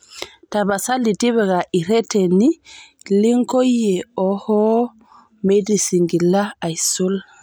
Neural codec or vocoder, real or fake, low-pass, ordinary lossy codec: none; real; none; none